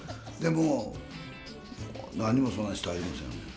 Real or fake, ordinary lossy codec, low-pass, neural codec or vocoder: real; none; none; none